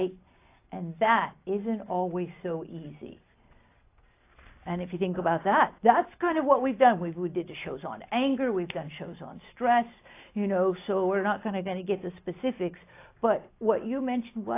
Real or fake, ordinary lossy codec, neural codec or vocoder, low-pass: real; AAC, 24 kbps; none; 3.6 kHz